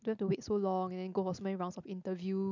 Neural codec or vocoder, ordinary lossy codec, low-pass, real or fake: none; none; 7.2 kHz; real